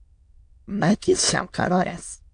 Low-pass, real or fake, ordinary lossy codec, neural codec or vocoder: 9.9 kHz; fake; AAC, 64 kbps; autoencoder, 22.05 kHz, a latent of 192 numbers a frame, VITS, trained on many speakers